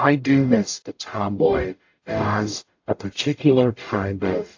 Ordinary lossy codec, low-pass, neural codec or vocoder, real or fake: AAC, 48 kbps; 7.2 kHz; codec, 44.1 kHz, 0.9 kbps, DAC; fake